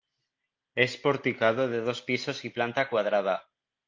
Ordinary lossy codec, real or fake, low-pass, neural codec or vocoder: Opus, 24 kbps; real; 7.2 kHz; none